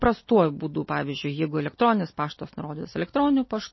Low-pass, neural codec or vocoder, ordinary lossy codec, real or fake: 7.2 kHz; none; MP3, 24 kbps; real